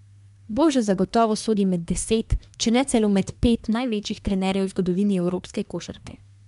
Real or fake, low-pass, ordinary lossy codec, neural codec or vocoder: fake; 10.8 kHz; MP3, 96 kbps; codec, 24 kHz, 1 kbps, SNAC